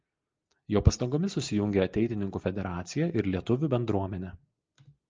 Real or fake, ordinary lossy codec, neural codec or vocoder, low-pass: real; Opus, 24 kbps; none; 7.2 kHz